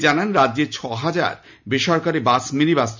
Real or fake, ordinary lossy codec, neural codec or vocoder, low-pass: real; MP3, 64 kbps; none; 7.2 kHz